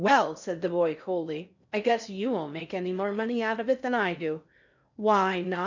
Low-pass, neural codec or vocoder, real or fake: 7.2 kHz; codec, 16 kHz in and 24 kHz out, 0.6 kbps, FocalCodec, streaming, 4096 codes; fake